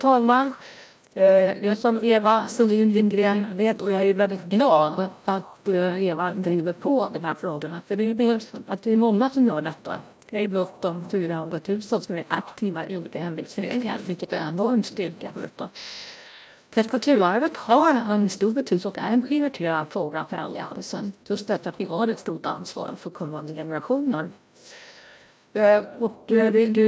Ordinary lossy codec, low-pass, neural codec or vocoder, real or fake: none; none; codec, 16 kHz, 0.5 kbps, FreqCodec, larger model; fake